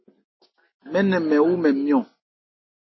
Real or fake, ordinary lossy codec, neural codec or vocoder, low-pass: real; MP3, 24 kbps; none; 7.2 kHz